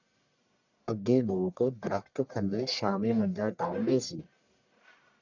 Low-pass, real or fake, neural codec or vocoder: 7.2 kHz; fake; codec, 44.1 kHz, 1.7 kbps, Pupu-Codec